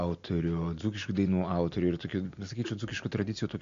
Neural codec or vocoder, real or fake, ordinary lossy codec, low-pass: none; real; MP3, 48 kbps; 7.2 kHz